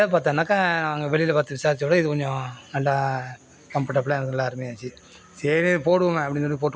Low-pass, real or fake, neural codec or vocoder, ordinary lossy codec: none; real; none; none